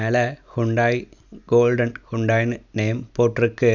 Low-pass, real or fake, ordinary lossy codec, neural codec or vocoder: 7.2 kHz; real; none; none